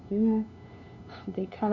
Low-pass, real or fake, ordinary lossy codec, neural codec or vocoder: 7.2 kHz; fake; none; codec, 16 kHz, 2 kbps, FunCodec, trained on LibriTTS, 25 frames a second